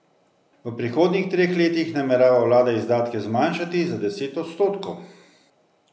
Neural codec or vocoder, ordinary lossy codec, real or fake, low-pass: none; none; real; none